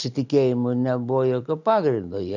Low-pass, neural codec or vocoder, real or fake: 7.2 kHz; none; real